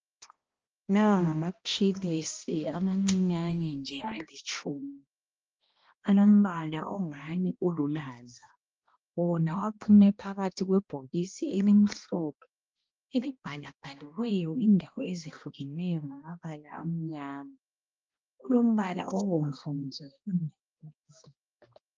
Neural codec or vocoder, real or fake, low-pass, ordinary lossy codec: codec, 16 kHz, 1 kbps, X-Codec, HuBERT features, trained on balanced general audio; fake; 7.2 kHz; Opus, 24 kbps